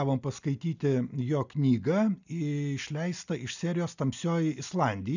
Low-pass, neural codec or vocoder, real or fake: 7.2 kHz; none; real